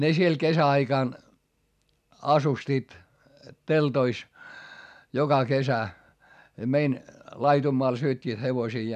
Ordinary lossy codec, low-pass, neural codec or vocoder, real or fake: none; 14.4 kHz; none; real